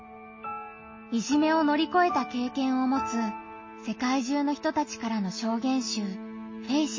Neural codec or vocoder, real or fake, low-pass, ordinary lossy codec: none; real; 7.2 kHz; MP3, 32 kbps